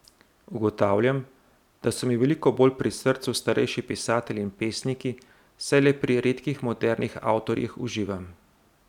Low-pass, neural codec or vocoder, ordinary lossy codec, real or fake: 19.8 kHz; none; none; real